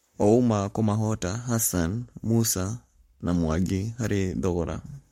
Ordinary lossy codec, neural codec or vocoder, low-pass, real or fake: MP3, 64 kbps; codec, 44.1 kHz, 7.8 kbps, Pupu-Codec; 19.8 kHz; fake